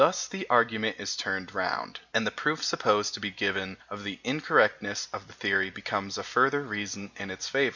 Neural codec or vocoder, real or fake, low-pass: none; real; 7.2 kHz